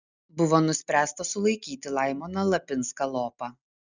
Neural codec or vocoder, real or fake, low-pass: none; real; 7.2 kHz